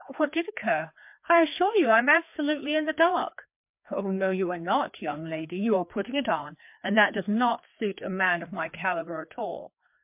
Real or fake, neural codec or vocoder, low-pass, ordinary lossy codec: fake; codec, 16 kHz, 2 kbps, FreqCodec, larger model; 3.6 kHz; MP3, 32 kbps